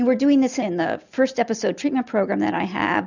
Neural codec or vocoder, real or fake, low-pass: none; real; 7.2 kHz